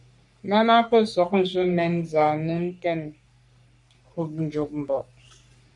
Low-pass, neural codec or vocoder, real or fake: 10.8 kHz; codec, 44.1 kHz, 3.4 kbps, Pupu-Codec; fake